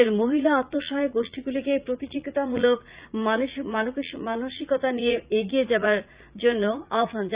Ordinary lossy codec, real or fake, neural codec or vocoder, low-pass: Opus, 64 kbps; fake; vocoder, 44.1 kHz, 80 mel bands, Vocos; 3.6 kHz